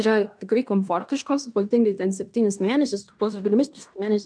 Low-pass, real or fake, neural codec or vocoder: 9.9 kHz; fake; codec, 16 kHz in and 24 kHz out, 0.9 kbps, LongCat-Audio-Codec, fine tuned four codebook decoder